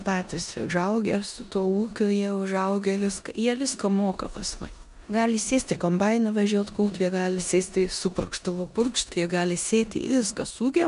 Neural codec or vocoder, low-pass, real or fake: codec, 16 kHz in and 24 kHz out, 0.9 kbps, LongCat-Audio-Codec, four codebook decoder; 10.8 kHz; fake